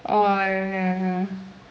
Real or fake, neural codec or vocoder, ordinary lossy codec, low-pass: fake; codec, 16 kHz, 2 kbps, X-Codec, HuBERT features, trained on general audio; none; none